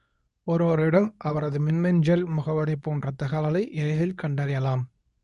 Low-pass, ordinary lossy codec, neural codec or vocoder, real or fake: 10.8 kHz; none; codec, 24 kHz, 0.9 kbps, WavTokenizer, medium speech release version 2; fake